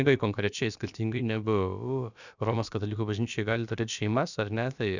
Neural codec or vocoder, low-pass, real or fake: codec, 16 kHz, about 1 kbps, DyCAST, with the encoder's durations; 7.2 kHz; fake